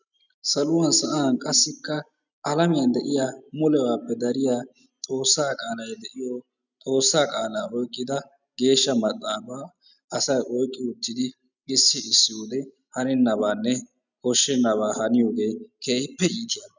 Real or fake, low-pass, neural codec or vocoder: real; 7.2 kHz; none